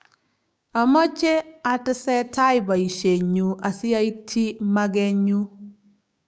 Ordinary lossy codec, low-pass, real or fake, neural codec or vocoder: none; none; fake; codec, 16 kHz, 6 kbps, DAC